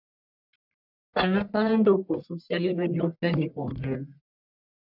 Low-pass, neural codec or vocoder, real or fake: 5.4 kHz; codec, 44.1 kHz, 1.7 kbps, Pupu-Codec; fake